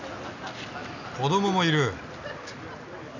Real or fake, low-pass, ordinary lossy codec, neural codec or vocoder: real; 7.2 kHz; none; none